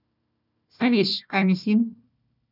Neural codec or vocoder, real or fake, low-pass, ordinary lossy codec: codec, 16 kHz, 1 kbps, FunCodec, trained on Chinese and English, 50 frames a second; fake; 5.4 kHz; none